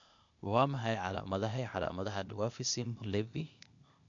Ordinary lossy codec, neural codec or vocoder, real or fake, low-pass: MP3, 96 kbps; codec, 16 kHz, 0.8 kbps, ZipCodec; fake; 7.2 kHz